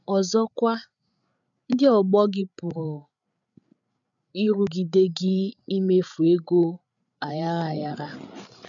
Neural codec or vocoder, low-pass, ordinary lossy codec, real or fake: codec, 16 kHz, 8 kbps, FreqCodec, larger model; 7.2 kHz; none; fake